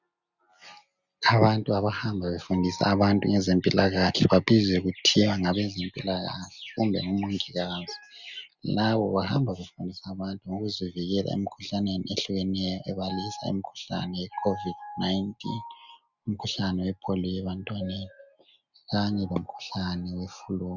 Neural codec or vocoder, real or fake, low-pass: none; real; 7.2 kHz